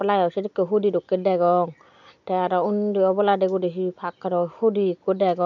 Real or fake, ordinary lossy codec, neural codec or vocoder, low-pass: real; none; none; 7.2 kHz